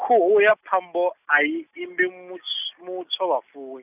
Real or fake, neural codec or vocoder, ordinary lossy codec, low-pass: real; none; none; 3.6 kHz